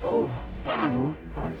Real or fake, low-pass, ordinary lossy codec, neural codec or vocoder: fake; 19.8 kHz; none; codec, 44.1 kHz, 0.9 kbps, DAC